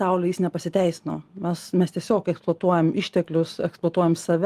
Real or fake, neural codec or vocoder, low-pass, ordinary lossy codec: real; none; 14.4 kHz; Opus, 24 kbps